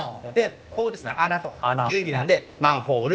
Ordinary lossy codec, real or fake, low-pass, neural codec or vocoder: none; fake; none; codec, 16 kHz, 0.8 kbps, ZipCodec